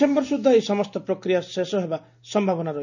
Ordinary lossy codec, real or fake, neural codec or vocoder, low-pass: none; real; none; 7.2 kHz